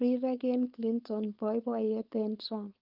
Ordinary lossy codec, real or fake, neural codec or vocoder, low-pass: Opus, 32 kbps; fake; codec, 16 kHz, 4.8 kbps, FACodec; 5.4 kHz